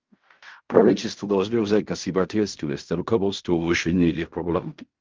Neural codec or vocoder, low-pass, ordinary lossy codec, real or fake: codec, 16 kHz in and 24 kHz out, 0.4 kbps, LongCat-Audio-Codec, fine tuned four codebook decoder; 7.2 kHz; Opus, 32 kbps; fake